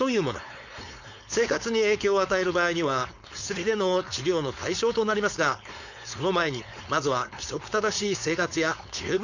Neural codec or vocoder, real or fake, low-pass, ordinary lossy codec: codec, 16 kHz, 4.8 kbps, FACodec; fake; 7.2 kHz; none